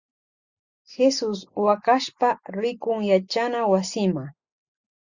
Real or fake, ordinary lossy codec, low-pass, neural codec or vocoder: real; Opus, 64 kbps; 7.2 kHz; none